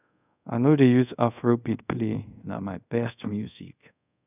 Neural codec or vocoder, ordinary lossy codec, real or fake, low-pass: codec, 24 kHz, 0.5 kbps, DualCodec; none; fake; 3.6 kHz